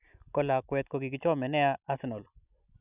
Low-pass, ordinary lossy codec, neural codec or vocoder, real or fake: 3.6 kHz; none; none; real